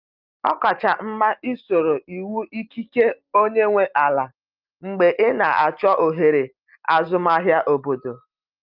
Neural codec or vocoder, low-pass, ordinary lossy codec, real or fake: none; 5.4 kHz; Opus, 32 kbps; real